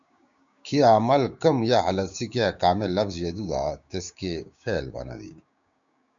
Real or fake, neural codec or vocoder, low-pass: fake; codec, 16 kHz, 6 kbps, DAC; 7.2 kHz